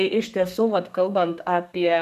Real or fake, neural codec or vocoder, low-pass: fake; codec, 32 kHz, 1.9 kbps, SNAC; 14.4 kHz